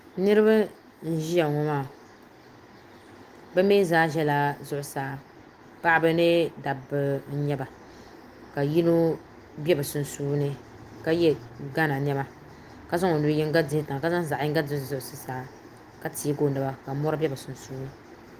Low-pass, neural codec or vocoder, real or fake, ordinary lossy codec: 14.4 kHz; none; real; Opus, 24 kbps